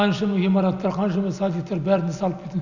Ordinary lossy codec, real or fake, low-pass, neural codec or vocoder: none; real; 7.2 kHz; none